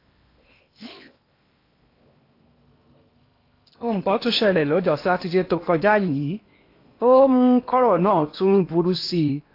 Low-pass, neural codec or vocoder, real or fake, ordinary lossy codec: 5.4 kHz; codec, 16 kHz in and 24 kHz out, 0.8 kbps, FocalCodec, streaming, 65536 codes; fake; AAC, 32 kbps